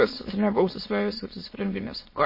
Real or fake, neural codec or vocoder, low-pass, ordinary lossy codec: fake; autoencoder, 22.05 kHz, a latent of 192 numbers a frame, VITS, trained on many speakers; 5.4 kHz; MP3, 24 kbps